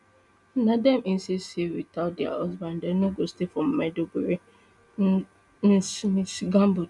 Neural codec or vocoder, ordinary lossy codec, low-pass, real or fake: none; none; 10.8 kHz; real